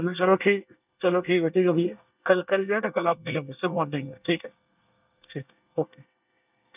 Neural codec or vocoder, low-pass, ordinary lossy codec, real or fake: codec, 24 kHz, 1 kbps, SNAC; 3.6 kHz; none; fake